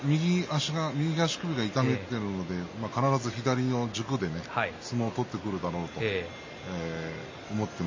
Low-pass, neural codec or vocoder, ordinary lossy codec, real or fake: 7.2 kHz; none; MP3, 32 kbps; real